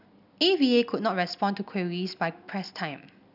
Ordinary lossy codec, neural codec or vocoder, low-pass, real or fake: none; none; 5.4 kHz; real